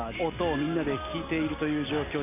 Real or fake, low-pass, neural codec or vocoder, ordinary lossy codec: real; 3.6 kHz; none; none